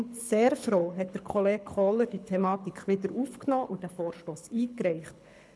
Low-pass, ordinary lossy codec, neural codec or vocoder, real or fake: none; none; codec, 24 kHz, 6 kbps, HILCodec; fake